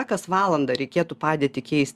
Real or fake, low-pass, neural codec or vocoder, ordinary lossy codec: real; 14.4 kHz; none; Opus, 64 kbps